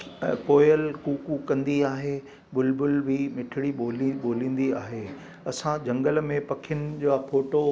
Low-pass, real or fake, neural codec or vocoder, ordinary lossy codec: none; real; none; none